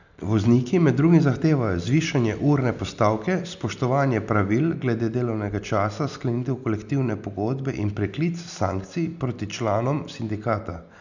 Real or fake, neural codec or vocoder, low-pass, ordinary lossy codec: real; none; 7.2 kHz; none